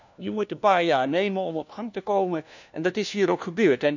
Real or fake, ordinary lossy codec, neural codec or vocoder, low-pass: fake; none; codec, 16 kHz, 1 kbps, FunCodec, trained on LibriTTS, 50 frames a second; 7.2 kHz